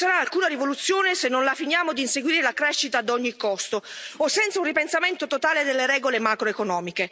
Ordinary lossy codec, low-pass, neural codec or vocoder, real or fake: none; none; none; real